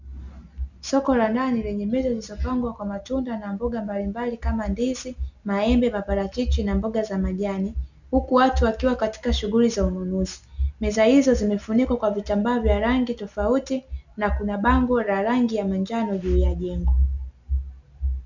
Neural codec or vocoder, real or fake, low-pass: none; real; 7.2 kHz